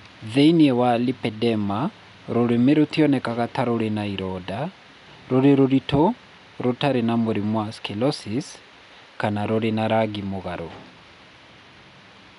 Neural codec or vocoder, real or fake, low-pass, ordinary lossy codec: none; real; 10.8 kHz; none